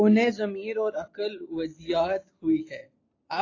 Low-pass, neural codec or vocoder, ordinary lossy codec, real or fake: 7.2 kHz; none; AAC, 32 kbps; real